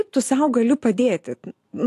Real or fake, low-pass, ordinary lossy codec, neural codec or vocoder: real; 14.4 kHz; AAC, 64 kbps; none